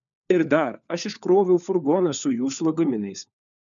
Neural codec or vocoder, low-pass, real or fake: codec, 16 kHz, 4 kbps, FunCodec, trained on LibriTTS, 50 frames a second; 7.2 kHz; fake